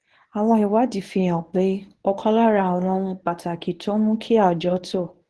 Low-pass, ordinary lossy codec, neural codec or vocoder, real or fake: 10.8 kHz; Opus, 16 kbps; codec, 24 kHz, 0.9 kbps, WavTokenizer, medium speech release version 1; fake